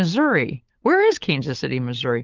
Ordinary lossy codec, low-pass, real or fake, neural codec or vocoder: Opus, 24 kbps; 7.2 kHz; fake; codec, 16 kHz, 16 kbps, FunCodec, trained on LibriTTS, 50 frames a second